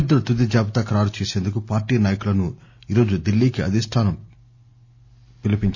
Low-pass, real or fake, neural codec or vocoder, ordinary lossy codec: 7.2 kHz; real; none; none